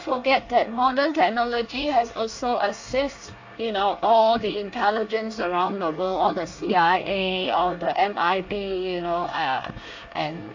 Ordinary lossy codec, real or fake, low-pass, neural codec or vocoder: AAC, 48 kbps; fake; 7.2 kHz; codec, 24 kHz, 1 kbps, SNAC